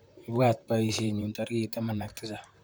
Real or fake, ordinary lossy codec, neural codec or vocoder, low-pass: fake; none; vocoder, 44.1 kHz, 128 mel bands, Pupu-Vocoder; none